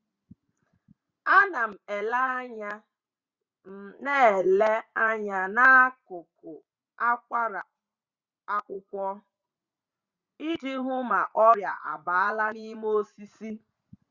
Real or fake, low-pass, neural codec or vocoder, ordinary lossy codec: fake; 7.2 kHz; vocoder, 44.1 kHz, 80 mel bands, Vocos; Opus, 64 kbps